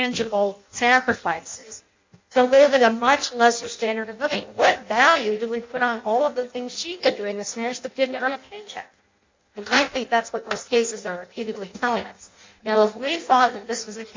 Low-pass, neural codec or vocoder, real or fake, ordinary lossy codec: 7.2 kHz; codec, 16 kHz in and 24 kHz out, 0.6 kbps, FireRedTTS-2 codec; fake; MP3, 64 kbps